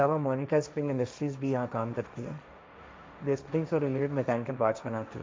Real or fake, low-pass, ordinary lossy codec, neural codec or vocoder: fake; none; none; codec, 16 kHz, 1.1 kbps, Voila-Tokenizer